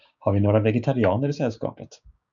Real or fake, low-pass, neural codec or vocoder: fake; 7.2 kHz; codec, 16 kHz, 6 kbps, DAC